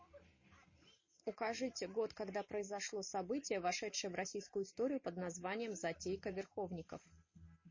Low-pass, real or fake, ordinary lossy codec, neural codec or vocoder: 7.2 kHz; real; MP3, 32 kbps; none